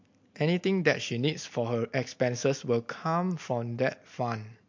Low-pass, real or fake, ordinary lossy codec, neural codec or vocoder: 7.2 kHz; real; MP3, 48 kbps; none